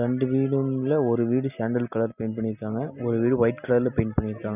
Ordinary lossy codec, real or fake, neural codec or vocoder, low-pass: none; real; none; 3.6 kHz